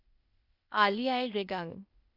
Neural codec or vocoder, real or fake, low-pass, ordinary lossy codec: codec, 16 kHz, 0.8 kbps, ZipCodec; fake; 5.4 kHz; none